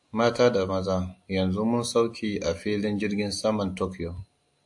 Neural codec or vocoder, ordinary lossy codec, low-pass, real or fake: none; AAC, 64 kbps; 10.8 kHz; real